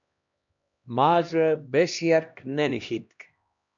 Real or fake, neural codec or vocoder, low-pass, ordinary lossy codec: fake; codec, 16 kHz, 1 kbps, X-Codec, HuBERT features, trained on LibriSpeech; 7.2 kHz; MP3, 64 kbps